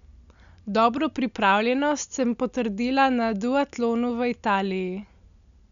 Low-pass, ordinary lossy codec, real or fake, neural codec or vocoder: 7.2 kHz; none; real; none